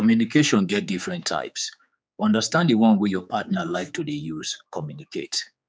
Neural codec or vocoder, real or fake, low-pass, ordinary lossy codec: codec, 16 kHz, 4 kbps, X-Codec, HuBERT features, trained on general audio; fake; none; none